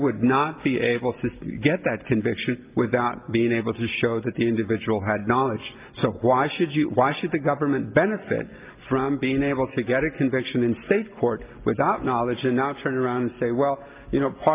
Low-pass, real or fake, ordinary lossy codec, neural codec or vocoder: 3.6 kHz; real; Opus, 24 kbps; none